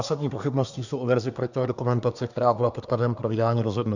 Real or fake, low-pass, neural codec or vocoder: fake; 7.2 kHz; codec, 24 kHz, 1 kbps, SNAC